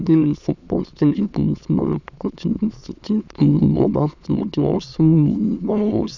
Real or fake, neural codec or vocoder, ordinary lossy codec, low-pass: fake; autoencoder, 22.05 kHz, a latent of 192 numbers a frame, VITS, trained on many speakers; none; 7.2 kHz